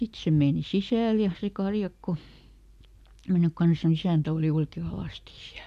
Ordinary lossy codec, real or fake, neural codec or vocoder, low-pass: AAC, 96 kbps; real; none; 14.4 kHz